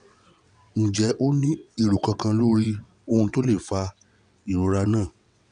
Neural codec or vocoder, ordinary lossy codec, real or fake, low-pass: vocoder, 22.05 kHz, 80 mel bands, WaveNeXt; none; fake; 9.9 kHz